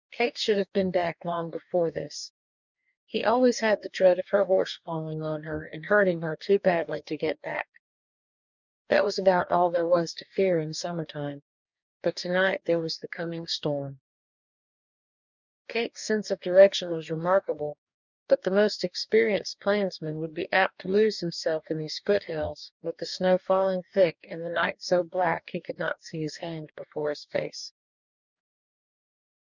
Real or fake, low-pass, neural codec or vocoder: fake; 7.2 kHz; codec, 44.1 kHz, 2.6 kbps, DAC